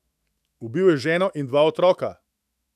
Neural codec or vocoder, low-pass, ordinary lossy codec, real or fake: autoencoder, 48 kHz, 128 numbers a frame, DAC-VAE, trained on Japanese speech; 14.4 kHz; none; fake